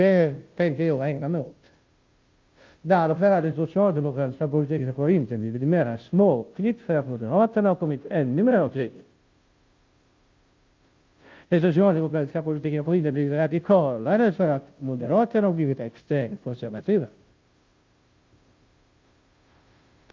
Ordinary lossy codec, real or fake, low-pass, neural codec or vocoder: Opus, 32 kbps; fake; 7.2 kHz; codec, 16 kHz, 0.5 kbps, FunCodec, trained on Chinese and English, 25 frames a second